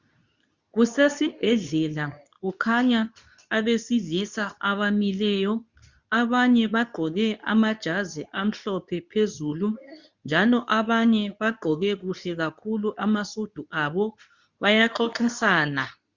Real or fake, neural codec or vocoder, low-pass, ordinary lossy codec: fake; codec, 24 kHz, 0.9 kbps, WavTokenizer, medium speech release version 2; 7.2 kHz; Opus, 64 kbps